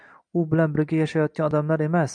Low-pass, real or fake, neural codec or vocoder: 9.9 kHz; real; none